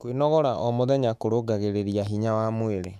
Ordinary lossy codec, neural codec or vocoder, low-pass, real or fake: none; autoencoder, 48 kHz, 128 numbers a frame, DAC-VAE, trained on Japanese speech; 14.4 kHz; fake